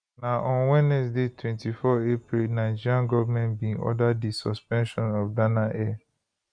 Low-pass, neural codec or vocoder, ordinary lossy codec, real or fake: 9.9 kHz; none; none; real